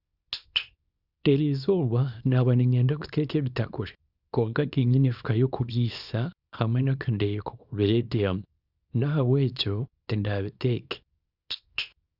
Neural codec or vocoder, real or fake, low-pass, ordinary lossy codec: codec, 24 kHz, 0.9 kbps, WavTokenizer, small release; fake; 5.4 kHz; Opus, 64 kbps